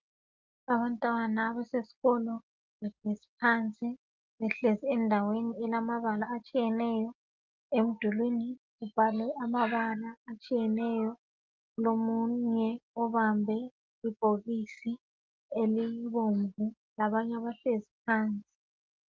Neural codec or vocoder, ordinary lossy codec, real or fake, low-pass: none; Opus, 32 kbps; real; 7.2 kHz